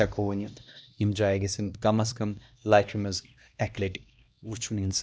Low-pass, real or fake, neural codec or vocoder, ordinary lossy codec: none; fake; codec, 16 kHz, 1 kbps, X-Codec, HuBERT features, trained on LibriSpeech; none